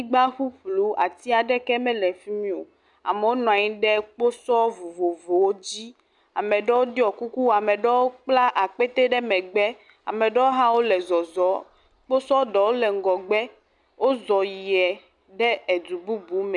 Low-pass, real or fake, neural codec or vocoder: 10.8 kHz; real; none